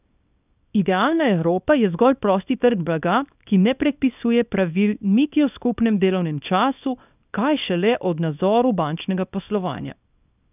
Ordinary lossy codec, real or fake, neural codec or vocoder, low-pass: none; fake; codec, 24 kHz, 0.9 kbps, WavTokenizer, small release; 3.6 kHz